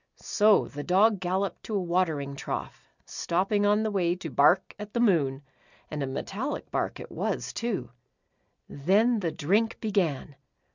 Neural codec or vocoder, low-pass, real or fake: none; 7.2 kHz; real